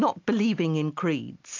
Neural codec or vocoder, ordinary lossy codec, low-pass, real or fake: none; AAC, 48 kbps; 7.2 kHz; real